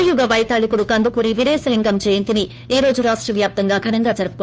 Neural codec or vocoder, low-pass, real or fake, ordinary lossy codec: codec, 16 kHz, 2 kbps, FunCodec, trained on Chinese and English, 25 frames a second; none; fake; none